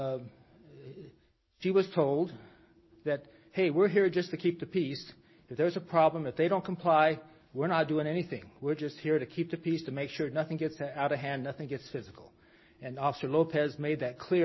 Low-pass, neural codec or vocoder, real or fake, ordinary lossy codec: 7.2 kHz; none; real; MP3, 24 kbps